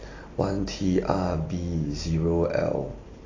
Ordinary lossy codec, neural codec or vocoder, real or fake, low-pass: AAC, 32 kbps; none; real; 7.2 kHz